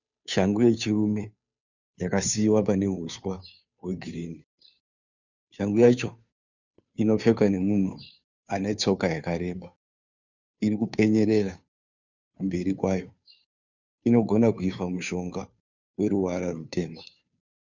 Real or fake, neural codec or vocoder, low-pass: fake; codec, 16 kHz, 2 kbps, FunCodec, trained on Chinese and English, 25 frames a second; 7.2 kHz